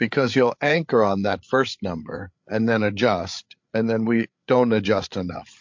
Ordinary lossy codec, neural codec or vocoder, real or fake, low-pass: MP3, 48 kbps; codec, 16 kHz, 8 kbps, FreqCodec, larger model; fake; 7.2 kHz